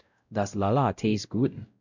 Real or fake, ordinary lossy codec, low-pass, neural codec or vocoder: fake; none; 7.2 kHz; codec, 16 kHz, 0.5 kbps, X-Codec, WavLM features, trained on Multilingual LibriSpeech